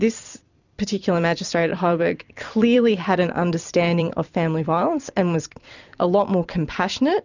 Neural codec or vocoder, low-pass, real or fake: vocoder, 44.1 kHz, 128 mel bands every 256 samples, BigVGAN v2; 7.2 kHz; fake